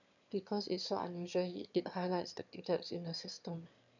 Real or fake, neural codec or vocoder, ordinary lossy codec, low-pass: fake; autoencoder, 22.05 kHz, a latent of 192 numbers a frame, VITS, trained on one speaker; none; 7.2 kHz